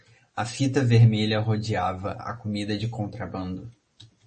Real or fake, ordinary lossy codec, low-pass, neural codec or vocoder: real; MP3, 32 kbps; 10.8 kHz; none